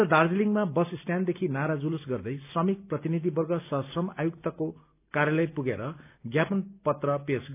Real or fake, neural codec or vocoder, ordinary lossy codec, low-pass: real; none; none; 3.6 kHz